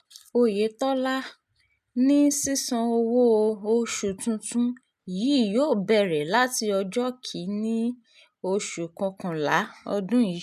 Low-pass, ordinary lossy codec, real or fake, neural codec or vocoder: 14.4 kHz; none; real; none